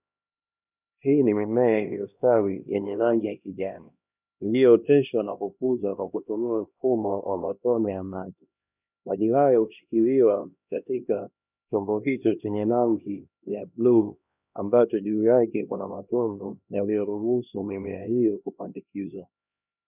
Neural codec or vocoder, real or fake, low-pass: codec, 16 kHz, 1 kbps, X-Codec, HuBERT features, trained on LibriSpeech; fake; 3.6 kHz